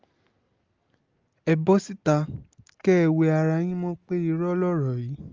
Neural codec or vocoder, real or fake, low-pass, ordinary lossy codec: none; real; 7.2 kHz; Opus, 32 kbps